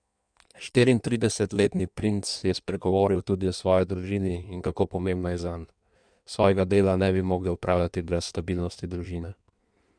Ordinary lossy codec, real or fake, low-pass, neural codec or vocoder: none; fake; 9.9 kHz; codec, 16 kHz in and 24 kHz out, 1.1 kbps, FireRedTTS-2 codec